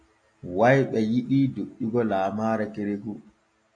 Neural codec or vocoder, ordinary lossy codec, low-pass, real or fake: none; AAC, 48 kbps; 9.9 kHz; real